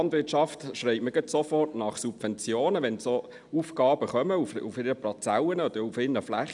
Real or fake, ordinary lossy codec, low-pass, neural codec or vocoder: real; none; 10.8 kHz; none